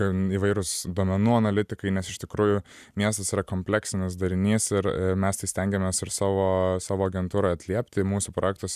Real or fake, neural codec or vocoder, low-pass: fake; vocoder, 44.1 kHz, 128 mel bands every 256 samples, BigVGAN v2; 14.4 kHz